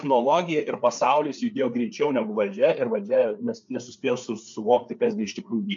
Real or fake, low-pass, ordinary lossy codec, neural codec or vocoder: fake; 7.2 kHz; MP3, 64 kbps; codec, 16 kHz, 4 kbps, FreqCodec, larger model